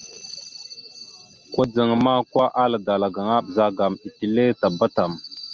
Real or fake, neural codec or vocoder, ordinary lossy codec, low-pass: real; none; Opus, 24 kbps; 7.2 kHz